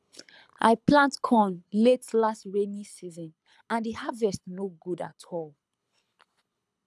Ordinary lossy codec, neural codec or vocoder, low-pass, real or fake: none; codec, 24 kHz, 6 kbps, HILCodec; none; fake